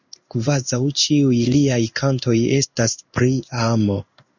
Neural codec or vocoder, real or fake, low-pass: codec, 16 kHz in and 24 kHz out, 1 kbps, XY-Tokenizer; fake; 7.2 kHz